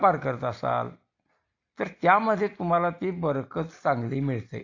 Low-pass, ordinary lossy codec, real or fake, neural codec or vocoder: 7.2 kHz; AAC, 48 kbps; real; none